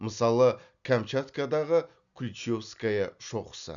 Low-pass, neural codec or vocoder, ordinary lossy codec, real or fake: 7.2 kHz; none; none; real